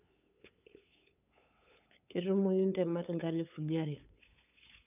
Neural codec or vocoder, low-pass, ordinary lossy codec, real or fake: codec, 16 kHz, 8 kbps, FunCodec, trained on LibriTTS, 25 frames a second; 3.6 kHz; none; fake